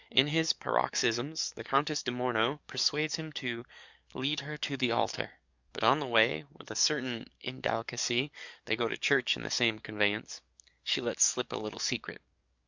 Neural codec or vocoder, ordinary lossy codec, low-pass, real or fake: codec, 44.1 kHz, 7.8 kbps, DAC; Opus, 64 kbps; 7.2 kHz; fake